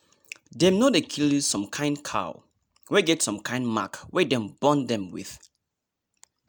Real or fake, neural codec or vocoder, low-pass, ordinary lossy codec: real; none; none; none